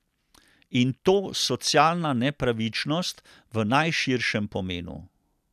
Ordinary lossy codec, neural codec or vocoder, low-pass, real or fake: none; none; 14.4 kHz; real